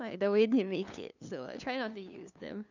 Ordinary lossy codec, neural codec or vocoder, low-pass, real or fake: none; codec, 16 kHz, 2 kbps, FunCodec, trained on LibriTTS, 25 frames a second; 7.2 kHz; fake